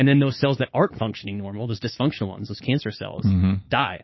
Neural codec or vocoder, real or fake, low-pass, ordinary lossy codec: codec, 16 kHz, 6 kbps, DAC; fake; 7.2 kHz; MP3, 24 kbps